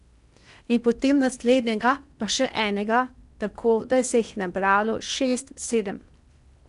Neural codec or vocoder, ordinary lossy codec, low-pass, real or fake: codec, 16 kHz in and 24 kHz out, 0.8 kbps, FocalCodec, streaming, 65536 codes; none; 10.8 kHz; fake